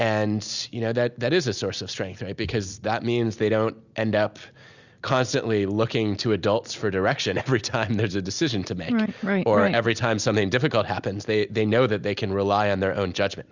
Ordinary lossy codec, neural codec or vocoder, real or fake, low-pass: Opus, 64 kbps; none; real; 7.2 kHz